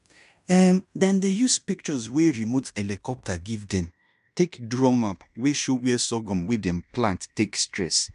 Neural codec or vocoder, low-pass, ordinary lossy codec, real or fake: codec, 16 kHz in and 24 kHz out, 0.9 kbps, LongCat-Audio-Codec, fine tuned four codebook decoder; 10.8 kHz; none; fake